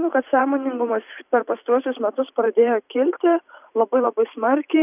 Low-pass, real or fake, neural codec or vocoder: 3.6 kHz; fake; vocoder, 44.1 kHz, 128 mel bands every 512 samples, BigVGAN v2